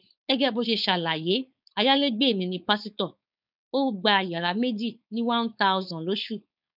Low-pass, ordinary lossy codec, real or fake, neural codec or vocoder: 5.4 kHz; none; fake; codec, 16 kHz, 4.8 kbps, FACodec